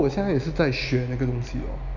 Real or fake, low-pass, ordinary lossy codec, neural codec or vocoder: real; 7.2 kHz; none; none